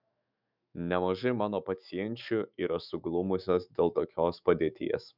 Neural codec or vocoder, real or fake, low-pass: autoencoder, 48 kHz, 128 numbers a frame, DAC-VAE, trained on Japanese speech; fake; 5.4 kHz